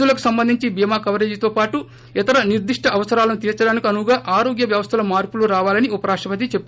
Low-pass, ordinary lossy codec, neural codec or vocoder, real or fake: none; none; none; real